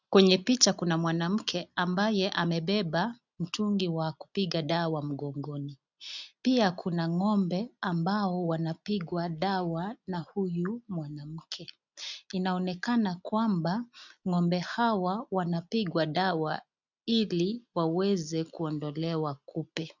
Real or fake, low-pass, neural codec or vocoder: real; 7.2 kHz; none